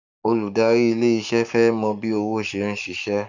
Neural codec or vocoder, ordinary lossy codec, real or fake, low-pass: codec, 44.1 kHz, 7.8 kbps, Pupu-Codec; none; fake; 7.2 kHz